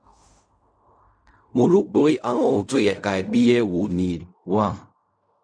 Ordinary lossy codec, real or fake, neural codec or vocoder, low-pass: none; fake; codec, 16 kHz in and 24 kHz out, 0.4 kbps, LongCat-Audio-Codec, fine tuned four codebook decoder; 9.9 kHz